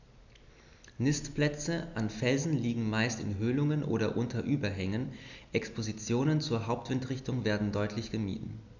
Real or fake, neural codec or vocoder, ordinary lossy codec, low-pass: real; none; none; 7.2 kHz